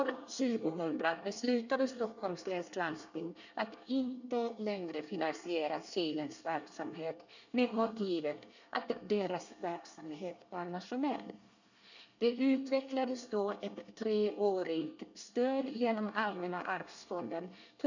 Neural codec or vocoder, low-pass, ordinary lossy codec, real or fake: codec, 24 kHz, 1 kbps, SNAC; 7.2 kHz; none; fake